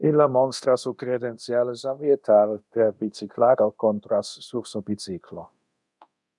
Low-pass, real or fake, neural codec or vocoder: 10.8 kHz; fake; codec, 24 kHz, 0.9 kbps, DualCodec